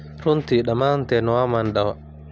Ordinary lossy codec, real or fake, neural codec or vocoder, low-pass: none; real; none; none